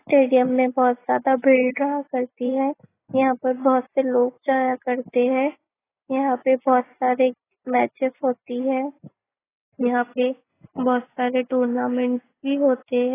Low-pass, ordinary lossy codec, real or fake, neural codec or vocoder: 3.6 kHz; AAC, 16 kbps; real; none